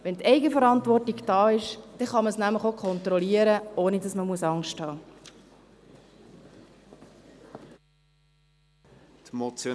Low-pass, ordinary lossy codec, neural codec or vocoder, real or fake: none; none; none; real